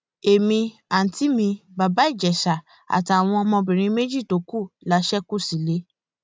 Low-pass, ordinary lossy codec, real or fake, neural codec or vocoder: none; none; real; none